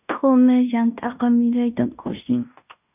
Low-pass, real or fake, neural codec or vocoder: 3.6 kHz; fake; codec, 16 kHz in and 24 kHz out, 0.9 kbps, LongCat-Audio-Codec, fine tuned four codebook decoder